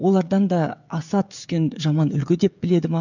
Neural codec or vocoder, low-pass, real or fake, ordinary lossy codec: codec, 16 kHz, 16 kbps, FreqCodec, smaller model; 7.2 kHz; fake; none